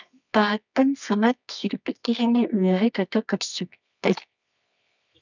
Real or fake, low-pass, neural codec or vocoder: fake; 7.2 kHz; codec, 24 kHz, 0.9 kbps, WavTokenizer, medium music audio release